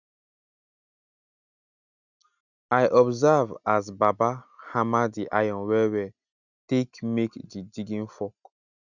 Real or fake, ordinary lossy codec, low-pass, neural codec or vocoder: real; none; 7.2 kHz; none